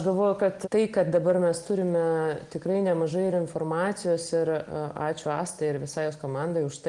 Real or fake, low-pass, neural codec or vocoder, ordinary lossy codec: real; 10.8 kHz; none; Opus, 24 kbps